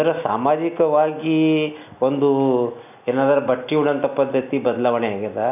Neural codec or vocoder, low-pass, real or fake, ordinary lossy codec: none; 3.6 kHz; real; none